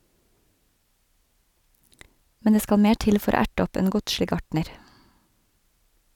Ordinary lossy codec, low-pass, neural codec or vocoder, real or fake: none; 19.8 kHz; none; real